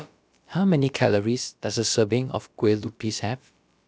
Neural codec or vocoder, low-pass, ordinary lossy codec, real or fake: codec, 16 kHz, about 1 kbps, DyCAST, with the encoder's durations; none; none; fake